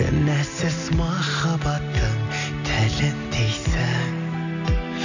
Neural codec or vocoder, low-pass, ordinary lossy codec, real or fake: none; 7.2 kHz; none; real